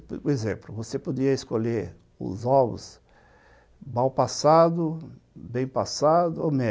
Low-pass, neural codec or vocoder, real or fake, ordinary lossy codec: none; none; real; none